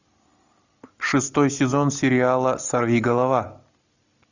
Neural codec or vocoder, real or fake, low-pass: none; real; 7.2 kHz